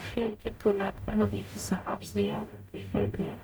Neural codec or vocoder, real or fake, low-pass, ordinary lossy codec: codec, 44.1 kHz, 0.9 kbps, DAC; fake; none; none